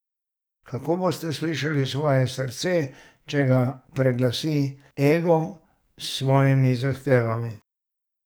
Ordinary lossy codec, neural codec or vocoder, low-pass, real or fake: none; codec, 44.1 kHz, 2.6 kbps, SNAC; none; fake